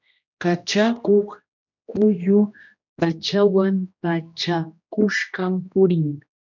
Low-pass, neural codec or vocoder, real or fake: 7.2 kHz; codec, 16 kHz, 1 kbps, X-Codec, HuBERT features, trained on general audio; fake